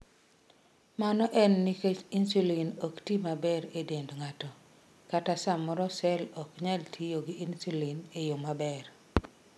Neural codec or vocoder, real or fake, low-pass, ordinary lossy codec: none; real; none; none